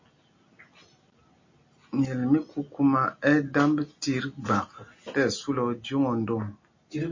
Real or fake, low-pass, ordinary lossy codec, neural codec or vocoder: real; 7.2 kHz; MP3, 32 kbps; none